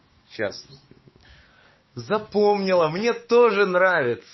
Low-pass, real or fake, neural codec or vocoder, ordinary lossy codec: 7.2 kHz; fake; codec, 16 kHz, 6 kbps, DAC; MP3, 24 kbps